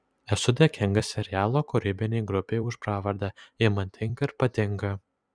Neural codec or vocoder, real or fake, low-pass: vocoder, 44.1 kHz, 128 mel bands every 256 samples, BigVGAN v2; fake; 9.9 kHz